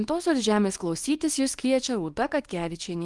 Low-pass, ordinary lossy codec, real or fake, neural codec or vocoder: 10.8 kHz; Opus, 24 kbps; fake; codec, 24 kHz, 0.9 kbps, WavTokenizer, small release